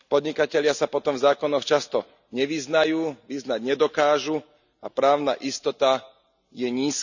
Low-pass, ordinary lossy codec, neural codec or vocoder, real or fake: 7.2 kHz; none; none; real